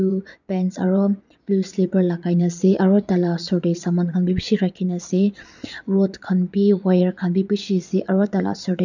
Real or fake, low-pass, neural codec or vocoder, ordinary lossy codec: fake; 7.2 kHz; vocoder, 22.05 kHz, 80 mel bands, Vocos; none